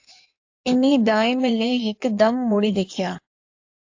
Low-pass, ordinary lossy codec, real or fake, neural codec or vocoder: 7.2 kHz; AAC, 48 kbps; fake; codec, 16 kHz in and 24 kHz out, 1.1 kbps, FireRedTTS-2 codec